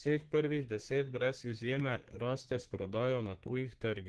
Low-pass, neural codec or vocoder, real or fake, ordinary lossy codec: 10.8 kHz; codec, 32 kHz, 1.9 kbps, SNAC; fake; Opus, 16 kbps